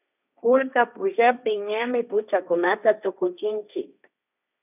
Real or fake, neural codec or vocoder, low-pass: fake; codec, 16 kHz, 1.1 kbps, Voila-Tokenizer; 3.6 kHz